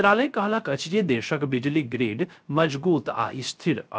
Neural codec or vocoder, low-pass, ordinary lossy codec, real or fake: codec, 16 kHz, 0.3 kbps, FocalCodec; none; none; fake